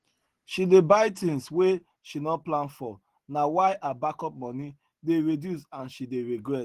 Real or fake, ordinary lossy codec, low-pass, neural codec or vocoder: real; Opus, 16 kbps; 14.4 kHz; none